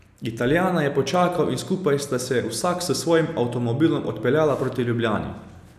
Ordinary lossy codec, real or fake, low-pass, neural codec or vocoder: none; real; 14.4 kHz; none